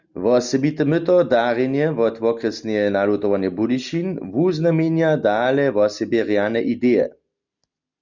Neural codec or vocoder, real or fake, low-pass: none; real; 7.2 kHz